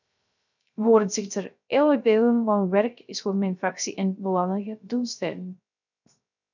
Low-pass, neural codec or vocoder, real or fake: 7.2 kHz; codec, 16 kHz, 0.3 kbps, FocalCodec; fake